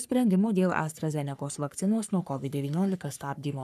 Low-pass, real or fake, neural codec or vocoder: 14.4 kHz; fake; codec, 44.1 kHz, 3.4 kbps, Pupu-Codec